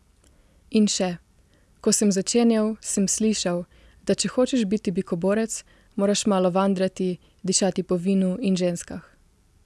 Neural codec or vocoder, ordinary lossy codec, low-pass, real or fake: none; none; none; real